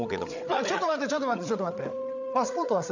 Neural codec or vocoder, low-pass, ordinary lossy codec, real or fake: codec, 16 kHz, 8 kbps, FreqCodec, larger model; 7.2 kHz; none; fake